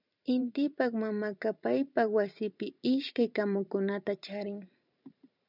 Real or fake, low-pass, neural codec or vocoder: fake; 5.4 kHz; vocoder, 44.1 kHz, 128 mel bands every 512 samples, BigVGAN v2